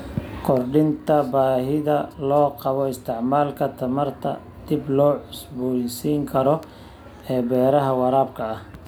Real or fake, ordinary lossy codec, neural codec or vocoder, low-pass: real; none; none; none